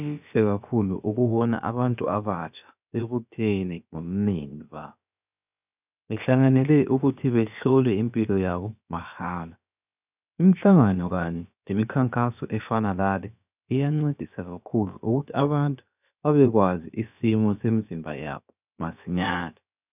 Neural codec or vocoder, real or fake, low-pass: codec, 16 kHz, about 1 kbps, DyCAST, with the encoder's durations; fake; 3.6 kHz